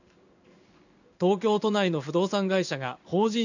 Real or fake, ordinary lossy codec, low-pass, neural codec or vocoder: fake; none; 7.2 kHz; codec, 16 kHz in and 24 kHz out, 1 kbps, XY-Tokenizer